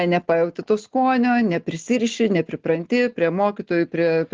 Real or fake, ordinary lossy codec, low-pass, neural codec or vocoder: real; Opus, 16 kbps; 7.2 kHz; none